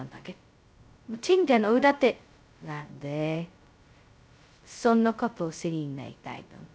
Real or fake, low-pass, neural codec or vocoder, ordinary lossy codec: fake; none; codec, 16 kHz, 0.2 kbps, FocalCodec; none